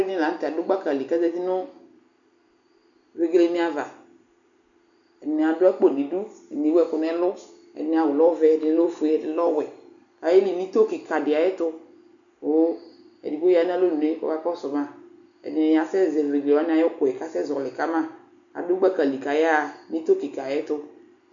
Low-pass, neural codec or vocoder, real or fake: 7.2 kHz; none; real